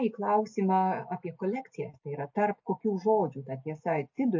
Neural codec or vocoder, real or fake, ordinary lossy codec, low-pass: none; real; MP3, 48 kbps; 7.2 kHz